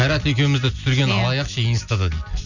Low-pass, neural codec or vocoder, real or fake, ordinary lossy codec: 7.2 kHz; none; real; none